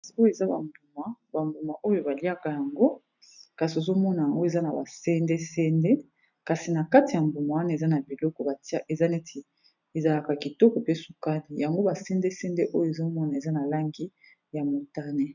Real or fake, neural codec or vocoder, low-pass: real; none; 7.2 kHz